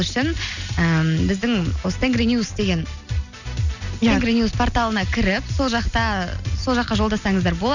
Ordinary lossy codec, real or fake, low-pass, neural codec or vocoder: none; real; 7.2 kHz; none